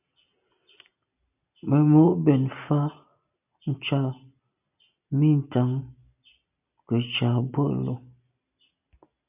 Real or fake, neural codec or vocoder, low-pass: real; none; 3.6 kHz